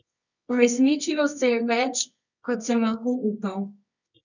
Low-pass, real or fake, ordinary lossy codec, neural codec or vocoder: 7.2 kHz; fake; none; codec, 24 kHz, 0.9 kbps, WavTokenizer, medium music audio release